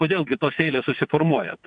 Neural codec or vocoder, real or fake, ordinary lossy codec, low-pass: vocoder, 22.05 kHz, 80 mel bands, WaveNeXt; fake; AAC, 64 kbps; 9.9 kHz